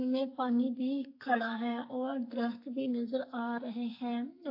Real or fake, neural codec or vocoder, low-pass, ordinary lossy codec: fake; codec, 44.1 kHz, 2.6 kbps, SNAC; 5.4 kHz; AAC, 32 kbps